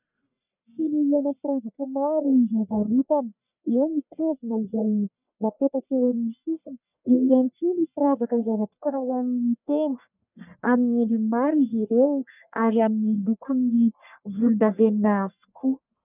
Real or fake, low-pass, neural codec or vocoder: fake; 3.6 kHz; codec, 44.1 kHz, 1.7 kbps, Pupu-Codec